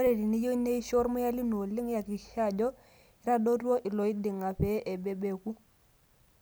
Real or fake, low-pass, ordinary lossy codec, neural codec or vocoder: real; none; none; none